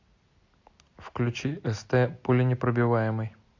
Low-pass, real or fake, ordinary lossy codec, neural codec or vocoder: 7.2 kHz; real; AAC, 48 kbps; none